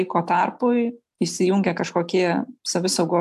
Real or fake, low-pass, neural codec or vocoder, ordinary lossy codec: real; 14.4 kHz; none; AAC, 96 kbps